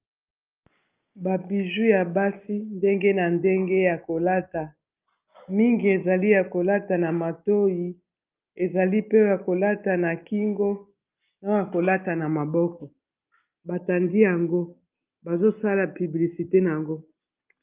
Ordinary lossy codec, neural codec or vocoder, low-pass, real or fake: Opus, 24 kbps; none; 3.6 kHz; real